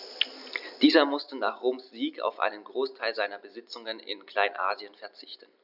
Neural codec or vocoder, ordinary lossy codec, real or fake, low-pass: none; none; real; 5.4 kHz